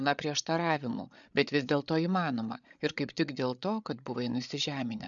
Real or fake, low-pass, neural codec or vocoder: fake; 7.2 kHz; codec, 16 kHz, 8 kbps, FreqCodec, larger model